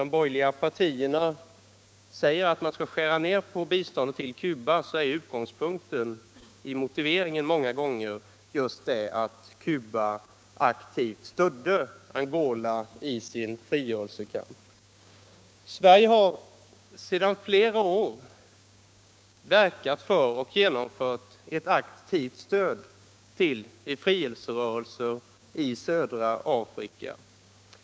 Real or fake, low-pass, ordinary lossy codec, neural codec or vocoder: fake; none; none; codec, 16 kHz, 6 kbps, DAC